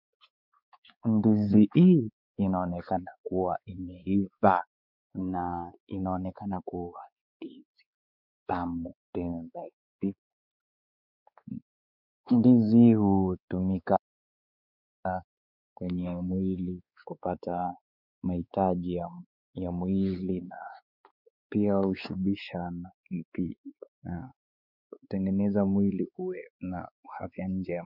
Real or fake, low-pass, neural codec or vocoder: fake; 5.4 kHz; codec, 16 kHz, 4 kbps, X-Codec, WavLM features, trained on Multilingual LibriSpeech